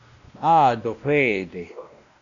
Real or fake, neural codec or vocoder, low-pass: fake; codec, 16 kHz, 1 kbps, X-Codec, WavLM features, trained on Multilingual LibriSpeech; 7.2 kHz